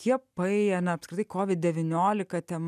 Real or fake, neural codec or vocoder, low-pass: real; none; 14.4 kHz